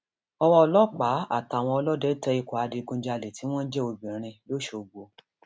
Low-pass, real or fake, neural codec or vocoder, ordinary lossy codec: none; real; none; none